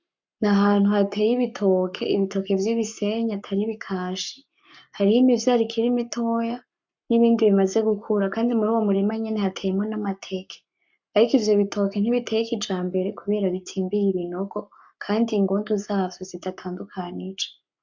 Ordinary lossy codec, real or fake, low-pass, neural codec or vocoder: MP3, 64 kbps; fake; 7.2 kHz; codec, 44.1 kHz, 7.8 kbps, Pupu-Codec